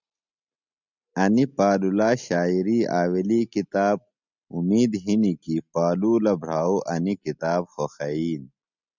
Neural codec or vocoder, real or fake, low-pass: none; real; 7.2 kHz